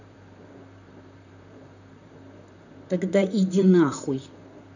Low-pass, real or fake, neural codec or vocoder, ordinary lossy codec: 7.2 kHz; fake; vocoder, 44.1 kHz, 128 mel bands, Pupu-Vocoder; none